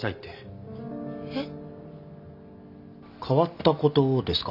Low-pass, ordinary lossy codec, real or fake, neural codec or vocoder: 5.4 kHz; none; real; none